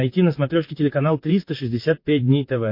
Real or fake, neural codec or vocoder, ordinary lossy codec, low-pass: fake; vocoder, 44.1 kHz, 128 mel bands, Pupu-Vocoder; MP3, 32 kbps; 5.4 kHz